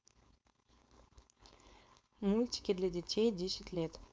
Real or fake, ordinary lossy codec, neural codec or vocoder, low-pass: fake; none; codec, 16 kHz, 4.8 kbps, FACodec; none